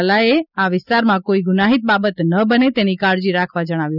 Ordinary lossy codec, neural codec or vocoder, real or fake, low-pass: none; none; real; 5.4 kHz